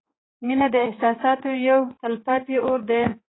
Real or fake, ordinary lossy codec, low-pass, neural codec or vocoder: fake; AAC, 16 kbps; 7.2 kHz; codec, 16 kHz, 4 kbps, X-Codec, HuBERT features, trained on general audio